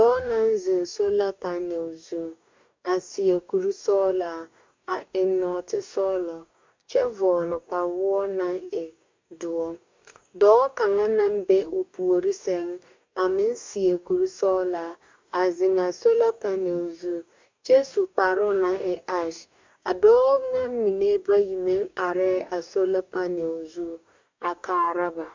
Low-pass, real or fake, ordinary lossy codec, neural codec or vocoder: 7.2 kHz; fake; MP3, 64 kbps; codec, 44.1 kHz, 2.6 kbps, DAC